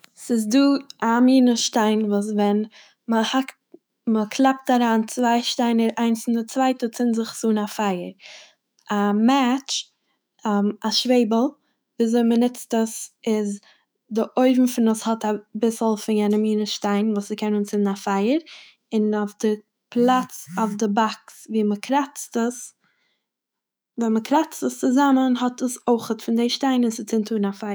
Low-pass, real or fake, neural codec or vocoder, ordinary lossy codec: none; fake; autoencoder, 48 kHz, 128 numbers a frame, DAC-VAE, trained on Japanese speech; none